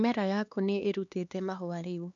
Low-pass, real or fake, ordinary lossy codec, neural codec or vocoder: 7.2 kHz; fake; none; codec, 16 kHz, 2 kbps, X-Codec, HuBERT features, trained on LibriSpeech